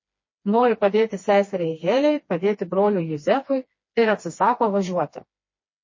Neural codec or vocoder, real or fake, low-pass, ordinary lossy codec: codec, 16 kHz, 2 kbps, FreqCodec, smaller model; fake; 7.2 kHz; MP3, 32 kbps